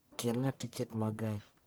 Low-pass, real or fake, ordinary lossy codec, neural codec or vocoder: none; fake; none; codec, 44.1 kHz, 1.7 kbps, Pupu-Codec